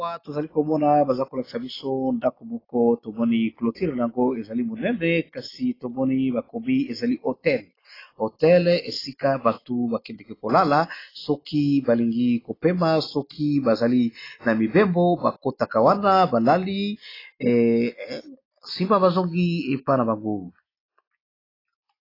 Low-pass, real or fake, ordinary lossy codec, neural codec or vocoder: 5.4 kHz; real; AAC, 24 kbps; none